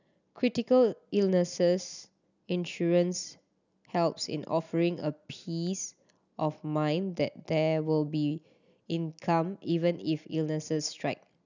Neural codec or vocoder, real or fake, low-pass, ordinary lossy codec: none; real; 7.2 kHz; none